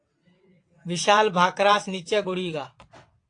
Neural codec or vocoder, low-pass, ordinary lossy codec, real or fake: vocoder, 22.05 kHz, 80 mel bands, WaveNeXt; 9.9 kHz; AAC, 48 kbps; fake